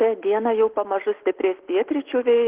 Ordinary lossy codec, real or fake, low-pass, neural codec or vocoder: Opus, 16 kbps; real; 3.6 kHz; none